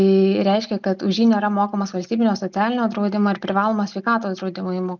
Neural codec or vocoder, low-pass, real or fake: none; 7.2 kHz; real